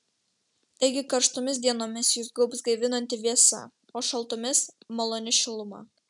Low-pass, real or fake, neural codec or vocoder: 10.8 kHz; real; none